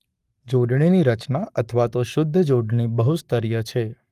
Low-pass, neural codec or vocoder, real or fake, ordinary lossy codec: 14.4 kHz; codec, 44.1 kHz, 7.8 kbps, Pupu-Codec; fake; Opus, 32 kbps